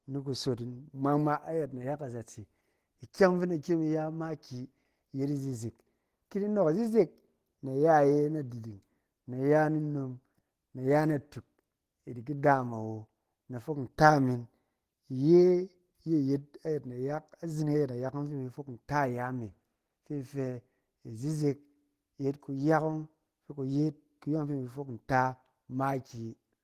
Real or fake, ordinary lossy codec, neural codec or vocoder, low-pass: real; Opus, 16 kbps; none; 14.4 kHz